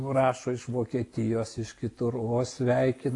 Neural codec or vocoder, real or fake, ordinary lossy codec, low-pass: none; real; AAC, 48 kbps; 10.8 kHz